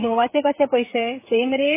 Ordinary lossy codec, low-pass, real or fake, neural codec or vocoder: MP3, 16 kbps; 3.6 kHz; fake; codec, 16 kHz, 16 kbps, FreqCodec, smaller model